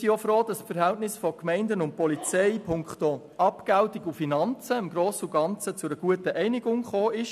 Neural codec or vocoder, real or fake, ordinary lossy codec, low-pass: none; real; none; 14.4 kHz